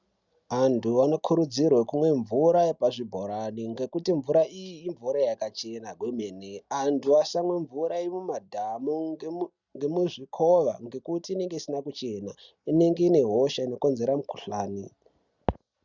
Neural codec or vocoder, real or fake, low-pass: none; real; 7.2 kHz